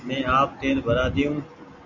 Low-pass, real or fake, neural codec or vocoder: 7.2 kHz; real; none